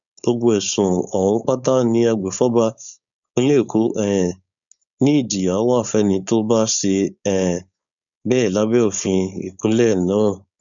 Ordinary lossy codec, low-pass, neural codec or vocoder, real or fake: none; 7.2 kHz; codec, 16 kHz, 4.8 kbps, FACodec; fake